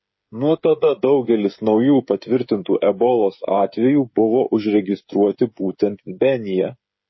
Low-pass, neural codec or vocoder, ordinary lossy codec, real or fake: 7.2 kHz; codec, 16 kHz, 16 kbps, FreqCodec, smaller model; MP3, 24 kbps; fake